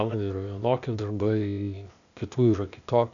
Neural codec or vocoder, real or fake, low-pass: codec, 16 kHz, 0.8 kbps, ZipCodec; fake; 7.2 kHz